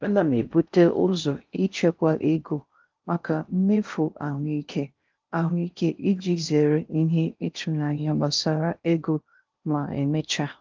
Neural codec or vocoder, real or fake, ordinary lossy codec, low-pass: codec, 16 kHz in and 24 kHz out, 0.6 kbps, FocalCodec, streaming, 4096 codes; fake; Opus, 32 kbps; 7.2 kHz